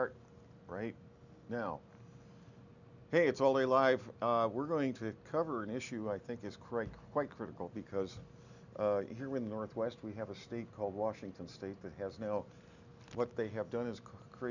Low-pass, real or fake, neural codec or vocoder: 7.2 kHz; real; none